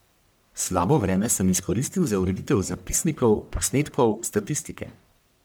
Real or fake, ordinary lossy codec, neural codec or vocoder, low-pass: fake; none; codec, 44.1 kHz, 1.7 kbps, Pupu-Codec; none